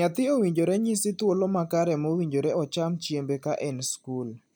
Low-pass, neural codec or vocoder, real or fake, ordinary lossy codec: none; none; real; none